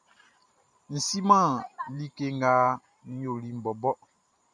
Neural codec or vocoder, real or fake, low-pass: vocoder, 44.1 kHz, 128 mel bands every 512 samples, BigVGAN v2; fake; 9.9 kHz